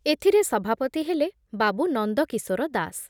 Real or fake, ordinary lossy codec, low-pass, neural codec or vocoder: real; none; 19.8 kHz; none